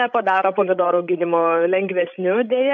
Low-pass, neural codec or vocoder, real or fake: 7.2 kHz; codec, 16 kHz, 16 kbps, FreqCodec, larger model; fake